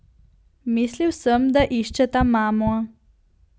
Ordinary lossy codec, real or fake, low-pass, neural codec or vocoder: none; real; none; none